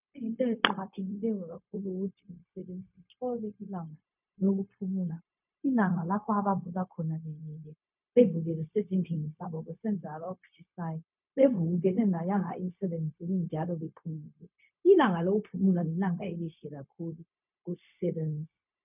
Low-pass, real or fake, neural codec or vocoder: 3.6 kHz; fake; codec, 16 kHz, 0.4 kbps, LongCat-Audio-Codec